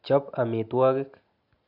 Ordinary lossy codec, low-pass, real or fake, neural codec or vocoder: none; 5.4 kHz; real; none